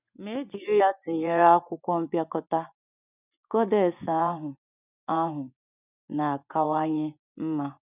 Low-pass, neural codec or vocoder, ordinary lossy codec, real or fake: 3.6 kHz; vocoder, 24 kHz, 100 mel bands, Vocos; none; fake